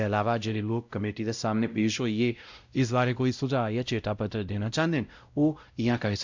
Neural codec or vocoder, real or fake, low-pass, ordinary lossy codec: codec, 16 kHz, 0.5 kbps, X-Codec, WavLM features, trained on Multilingual LibriSpeech; fake; 7.2 kHz; MP3, 64 kbps